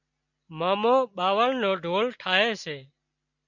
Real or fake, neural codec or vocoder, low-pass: real; none; 7.2 kHz